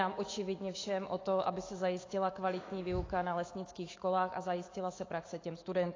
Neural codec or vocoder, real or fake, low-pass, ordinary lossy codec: autoencoder, 48 kHz, 128 numbers a frame, DAC-VAE, trained on Japanese speech; fake; 7.2 kHz; AAC, 32 kbps